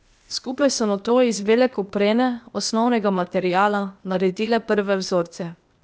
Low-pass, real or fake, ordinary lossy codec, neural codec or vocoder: none; fake; none; codec, 16 kHz, 0.8 kbps, ZipCodec